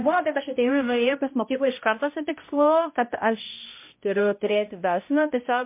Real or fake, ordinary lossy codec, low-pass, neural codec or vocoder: fake; MP3, 24 kbps; 3.6 kHz; codec, 16 kHz, 0.5 kbps, X-Codec, HuBERT features, trained on balanced general audio